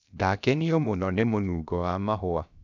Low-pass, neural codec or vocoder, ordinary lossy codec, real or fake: 7.2 kHz; codec, 16 kHz, about 1 kbps, DyCAST, with the encoder's durations; none; fake